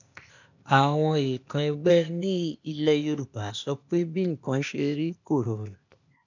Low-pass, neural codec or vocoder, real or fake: 7.2 kHz; codec, 16 kHz, 0.8 kbps, ZipCodec; fake